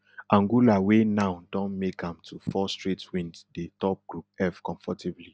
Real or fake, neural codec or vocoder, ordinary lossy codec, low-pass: real; none; none; none